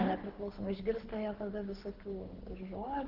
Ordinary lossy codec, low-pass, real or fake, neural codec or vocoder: Opus, 16 kbps; 5.4 kHz; fake; codec, 24 kHz, 6 kbps, HILCodec